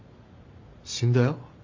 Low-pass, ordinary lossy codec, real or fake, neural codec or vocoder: 7.2 kHz; MP3, 48 kbps; real; none